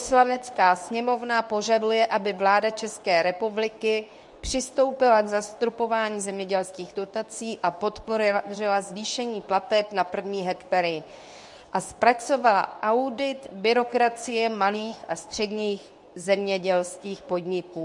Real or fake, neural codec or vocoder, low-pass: fake; codec, 24 kHz, 0.9 kbps, WavTokenizer, medium speech release version 2; 10.8 kHz